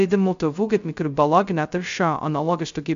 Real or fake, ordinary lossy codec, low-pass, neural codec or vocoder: fake; MP3, 64 kbps; 7.2 kHz; codec, 16 kHz, 0.2 kbps, FocalCodec